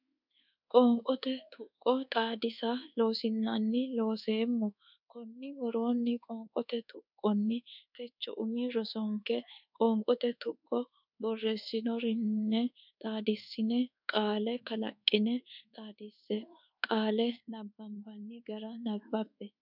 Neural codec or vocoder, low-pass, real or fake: codec, 24 kHz, 1.2 kbps, DualCodec; 5.4 kHz; fake